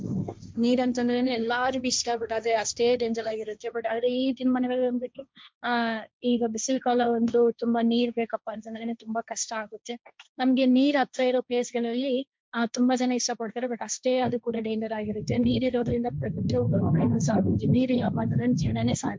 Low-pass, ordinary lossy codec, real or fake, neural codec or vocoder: none; none; fake; codec, 16 kHz, 1.1 kbps, Voila-Tokenizer